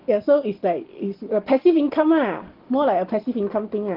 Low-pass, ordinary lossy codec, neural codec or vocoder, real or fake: 5.4 kHz; Opus, 16 kbps; none; real